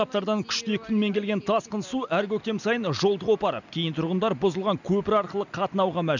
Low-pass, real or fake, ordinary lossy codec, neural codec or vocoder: 7.2 kHz; real; none; none